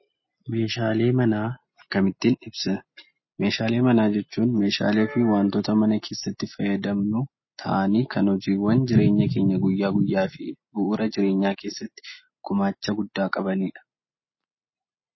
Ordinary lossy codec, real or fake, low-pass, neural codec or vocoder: MP3, 24 kbps; real; 7.2 kHz; none